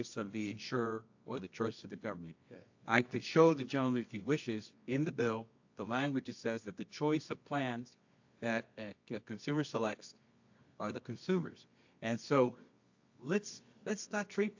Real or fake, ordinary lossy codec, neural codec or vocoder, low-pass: fake; AAC, 48 kbps; codec, 24 kHz, 0.9 kbps, WavTokenizer, medium music audio release; 7.2 kHz